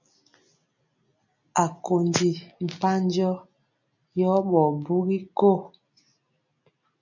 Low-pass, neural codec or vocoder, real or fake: 7.2 kHz; none; real